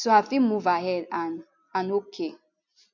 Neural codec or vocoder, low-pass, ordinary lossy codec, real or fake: none; 7.2 kHz; none; real